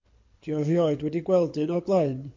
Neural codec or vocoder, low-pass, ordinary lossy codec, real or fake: codec, 24 kHz, 6 kbps, HILCodec; 7.2 kHz; MP3, 48 kbps; fake